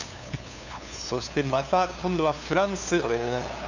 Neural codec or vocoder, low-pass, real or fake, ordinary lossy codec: codec, 16 kHz, 2 kbps, FunCodec, trained on LibriTTS, 25 frames a second; 7.2 kHz; fake; none